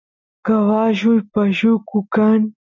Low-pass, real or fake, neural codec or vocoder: 7.2 kHz; real; none